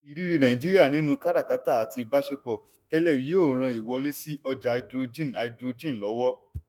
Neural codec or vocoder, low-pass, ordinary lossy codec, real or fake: autoencoder, 48 kHz, 32 numbers a frame, DAC-VAE, trained on Japanese speech; none; none; fake